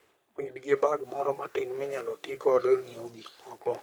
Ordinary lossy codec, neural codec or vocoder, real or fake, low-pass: none; codec, 44.1 kHz, 3.4 kbps, Pupu-Codec; fake; none